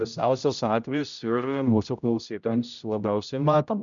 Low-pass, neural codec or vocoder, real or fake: 7.2 kHz; codec, 16 kHz, 0.5 kbps, X-Codec, HuBERT features, trained on general audio; fake